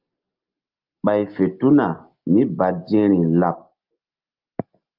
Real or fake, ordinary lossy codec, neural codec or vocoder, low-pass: real; Opus, 32 kbps; none; 5.4 kHz